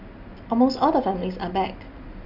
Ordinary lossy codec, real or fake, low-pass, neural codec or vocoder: none; real; 5.4 kHz; none